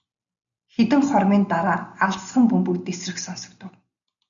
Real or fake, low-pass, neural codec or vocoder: real; 7.2 kHz; none